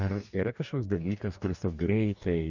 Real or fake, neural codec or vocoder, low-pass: fake; codec, 44.1 kHz, 2.6 kbps, DAC; 7.2 kHz